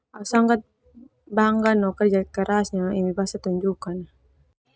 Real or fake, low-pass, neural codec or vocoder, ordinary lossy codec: real; none; none; none